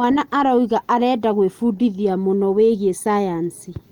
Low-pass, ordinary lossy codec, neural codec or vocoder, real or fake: 19.8 kHz; Opus, 24 kbps; none; real